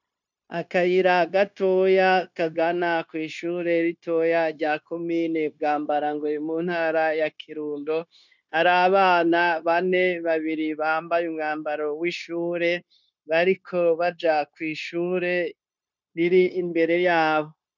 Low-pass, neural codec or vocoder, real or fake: 7.2 kHz; codec, 16 kHz, 0.9 kbps, LongCat-Audio-Codec; fake